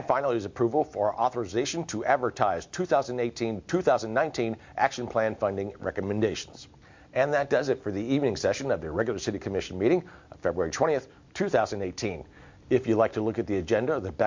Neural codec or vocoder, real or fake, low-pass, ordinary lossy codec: none; real; 7.2 kHz; MP3, 48 kbps